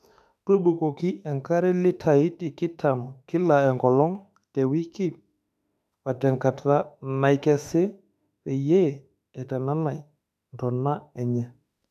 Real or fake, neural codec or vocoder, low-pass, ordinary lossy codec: fake; autoencoder, 48 kHz, 32 numbers a frame, DAC-VAE, trained on Japanese speech; 14.4 kHz; none